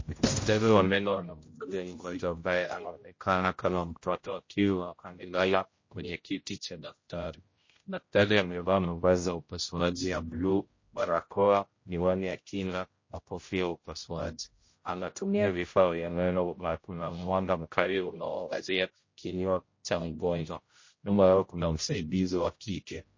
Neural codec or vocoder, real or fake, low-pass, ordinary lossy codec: codec, 16 kHz, 0.5 kbps, X-Codec, HuBERT features, trained on general audio; fake; 7.2 kHz; MP3, 32 kbps